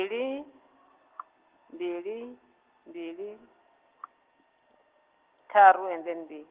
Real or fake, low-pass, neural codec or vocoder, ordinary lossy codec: real; 3.6 kHz; none; Opus, 16 kbps